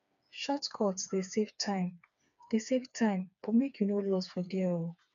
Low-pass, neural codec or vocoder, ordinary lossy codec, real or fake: 7.2 kHz; codec, 16 kHz, 4 kbps, FreqCodec, smaller model; none; fake